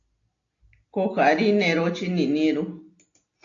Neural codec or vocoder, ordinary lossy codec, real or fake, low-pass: none; AAC, 48 kbps; real; 7.2 kHz